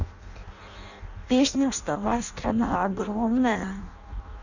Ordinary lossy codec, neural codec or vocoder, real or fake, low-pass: none; codec, 16 kHz in and 24 kHz out, 0.6 kbps, FireRedTTS-2 codec; fake; 7.2 kHz